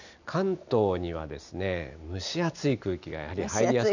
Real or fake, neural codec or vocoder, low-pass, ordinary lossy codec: real; none; 7.2 kHz; none